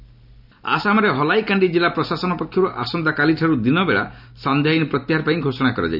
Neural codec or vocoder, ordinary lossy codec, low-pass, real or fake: none; none; 5.4 kHz; real